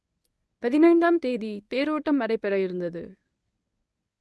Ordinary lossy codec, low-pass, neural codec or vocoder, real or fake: none; none; codec, 24 kHz, 0.9 kbps, WavTokenizer, medium speech release version 1; fake